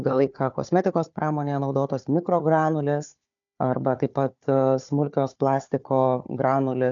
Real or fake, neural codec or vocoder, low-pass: fake; codec, 16 kHz, 4 kbps, FunCodec, trained on Chinese and English, 50 frames a second; 7.2 kHz